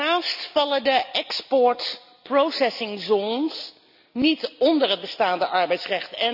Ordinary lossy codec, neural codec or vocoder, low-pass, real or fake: none; none; 5.4 kHz; real